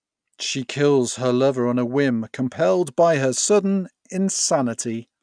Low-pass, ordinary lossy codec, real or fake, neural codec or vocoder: 9.9 kHz; none; real; none